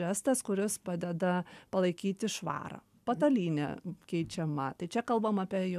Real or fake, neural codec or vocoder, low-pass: real; none; 14.4 kHz